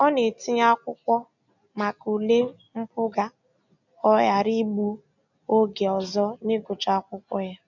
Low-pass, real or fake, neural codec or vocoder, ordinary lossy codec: 7.2 kHz; real; none; none